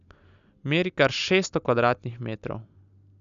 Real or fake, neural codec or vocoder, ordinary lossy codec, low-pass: real; none; none; 7.2 kHz